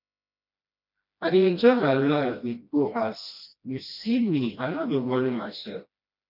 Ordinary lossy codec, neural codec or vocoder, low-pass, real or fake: none; codec, 16 kHz, 1 kbps, FreqCodec, smaller model; 5.4 kHz; fake